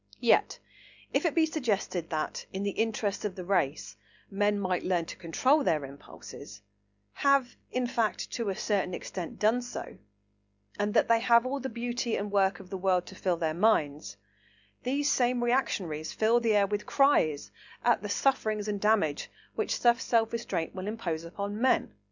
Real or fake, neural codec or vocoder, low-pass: real; none; 7.2 kHz